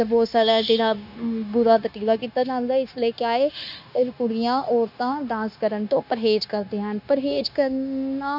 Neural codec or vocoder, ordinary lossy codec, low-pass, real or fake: codec, 16 kHz, 0.9 kbps, LongCat-Audio-Codec; none; 5.4 kHz; fake